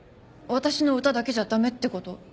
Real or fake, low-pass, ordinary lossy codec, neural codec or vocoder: real; none; none; none